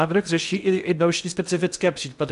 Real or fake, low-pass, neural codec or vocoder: fake; 10.8 kHz; codec, 16 kHz in and 24 kHz out, 0.6 kbps, FocalCodec, streaming, 2048 codes